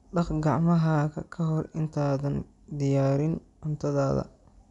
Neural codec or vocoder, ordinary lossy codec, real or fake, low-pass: none; none; real; 10.8 kHz